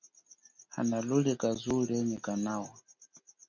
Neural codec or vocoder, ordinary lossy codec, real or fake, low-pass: none; MP3, 64 kbps; real; 7.2 kHz